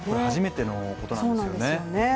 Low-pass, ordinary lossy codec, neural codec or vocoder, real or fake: none; none; none; real